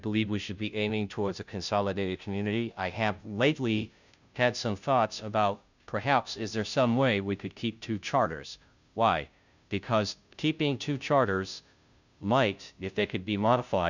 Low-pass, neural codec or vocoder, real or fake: 7.2 kHz; codec, 16 kHz, 0.5 kbps, FunCodec, trained on Chinese and English, 25 frames a second; fake